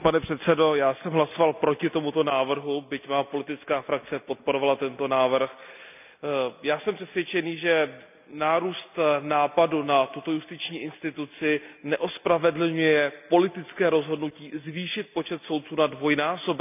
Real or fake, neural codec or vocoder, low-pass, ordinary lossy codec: real; none; 3.6 kHz; none